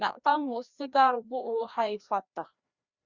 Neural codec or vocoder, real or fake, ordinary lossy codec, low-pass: codec, 16 kHz, 1 kbps, FreqCodec, larger model; fake; Opus, 64 kbps; 7.2 kHz